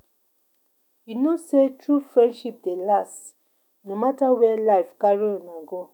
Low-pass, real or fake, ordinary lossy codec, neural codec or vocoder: 19.8 kHz; fake; none; autoencoder, 48 kHz, 128 numbers a frame, DAC-VAE, trained on Japanese speech